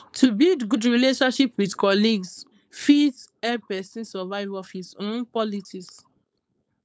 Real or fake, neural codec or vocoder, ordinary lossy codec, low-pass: fake; codec, 16 kHz, 4.8 kbps, FACodec; none; none